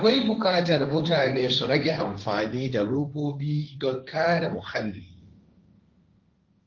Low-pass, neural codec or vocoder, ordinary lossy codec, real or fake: 7.2 kHz; codec, 16 kHz, 1.1 kbps, Voila-Tokenizer; Opus, 24 kbps; fake